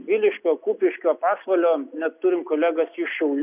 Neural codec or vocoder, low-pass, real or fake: none; 3.6 kHz; real